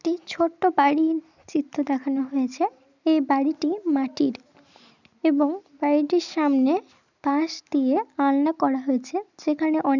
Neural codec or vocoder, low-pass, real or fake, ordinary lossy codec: none; 7.2 kHz; real; none